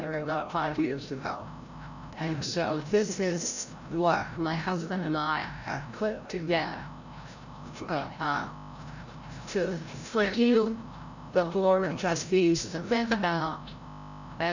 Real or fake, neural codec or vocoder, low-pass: fake; codec, 16 kHz, 0.5 kbps, FreqCodec, larger model; 7.2 kHz